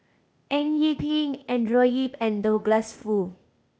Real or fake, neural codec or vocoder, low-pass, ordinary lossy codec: fake; codec, 16 kHz, 0.8 kbps, ZipCodec; none; none